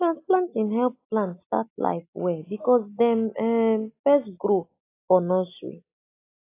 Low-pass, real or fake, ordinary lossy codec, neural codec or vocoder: 3.6 kHz; real; AAC, 24 kbps; none